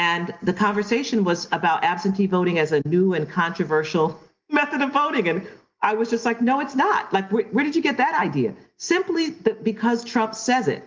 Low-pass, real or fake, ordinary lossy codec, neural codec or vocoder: 7.2 kHz; real; Opus, 32 kbps; none